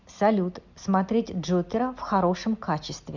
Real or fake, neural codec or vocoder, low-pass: real; none; 7.2 kHz